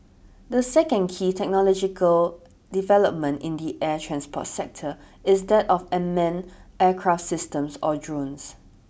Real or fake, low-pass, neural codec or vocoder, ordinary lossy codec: real; none; none; none